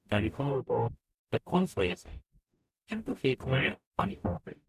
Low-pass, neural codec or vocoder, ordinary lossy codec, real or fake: 14.4 kHz; codec, 44.1 kHz, 0.9 kbps, DAC; none; fake